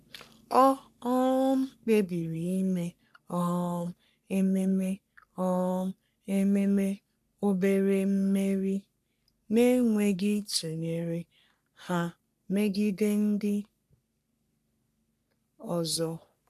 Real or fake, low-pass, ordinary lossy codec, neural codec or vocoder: fake; 14.4 kHz; none; codec, 44.1 kHz, 3.4 kbps, Pupu-Codec